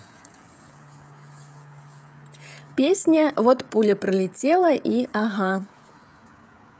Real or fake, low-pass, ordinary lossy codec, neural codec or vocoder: fake; none; none; codec, 16 kHz, 16 kbps, FreqCodec, smaller model